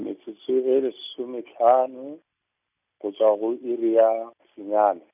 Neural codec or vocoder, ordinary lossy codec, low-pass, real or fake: none; none; 3.6 kHz; real